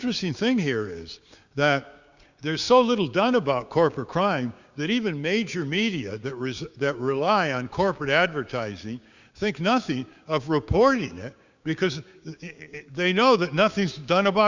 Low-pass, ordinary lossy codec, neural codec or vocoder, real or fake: 7.2 kHz; Opus, 64 kbps; codec, 16 kHz, 6 kbps, DAC; fake